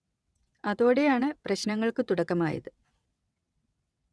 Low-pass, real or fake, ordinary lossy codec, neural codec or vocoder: none; fake; none; vocoder, 22.05 kHz, 80 mel bands, WaveNeXt